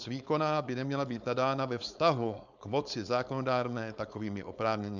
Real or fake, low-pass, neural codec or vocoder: fake; 7.2 kHz; codec, 16 kHz, 4.8 kbps, FACodec